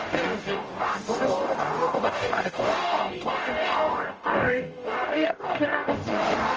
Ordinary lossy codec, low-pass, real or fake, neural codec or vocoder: Opus, 24 kbps; 7.2 kHz; fake; codec, 44.1 kHz, 0.9 kbps, DAC